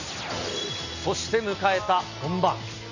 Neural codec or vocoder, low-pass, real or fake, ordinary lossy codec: none; 7.2 kHz; real; none